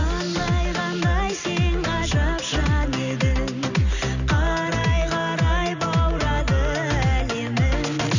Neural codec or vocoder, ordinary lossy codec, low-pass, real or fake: none; none; 7.2 kHz; real